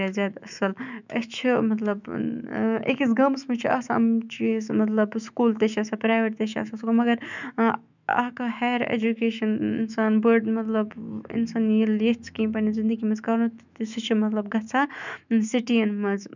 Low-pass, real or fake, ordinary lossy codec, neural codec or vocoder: 7.2 kHz; fake; none; autoencoder, 48 kHz, 128 numbers a frame, DAC-VAE, trained on Japanese speech